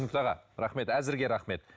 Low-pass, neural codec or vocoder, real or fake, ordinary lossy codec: none; none; real; none